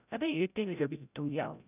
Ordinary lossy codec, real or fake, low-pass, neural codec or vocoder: none; fake; 3.6 kHz; codec, 16 kHz, 0.5 kbps, FreqCodec, larger model